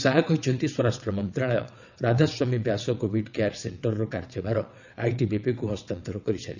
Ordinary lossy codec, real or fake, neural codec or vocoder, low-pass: none; fake; vocoder, 22.05 kHz, 80 mel bands, WaveNeXt; 7.2 kHz